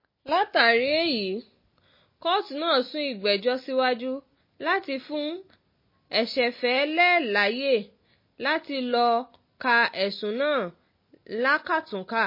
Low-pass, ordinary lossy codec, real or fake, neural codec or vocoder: 5.4 kHz; MP3, 24 kbps; real; none